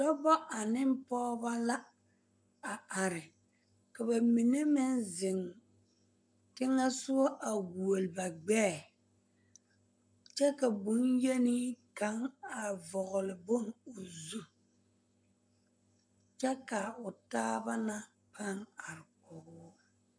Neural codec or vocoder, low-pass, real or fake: codec, 44.1 kHz, 7.8 kbps, Pupu-Codec; 9.9 kHz; fake